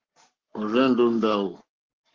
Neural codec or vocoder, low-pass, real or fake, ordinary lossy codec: codec, 44.1 kHz, 7.8 kbps, Pupu-Codec; 7.2 kHz; fake; Opus, 16 kbps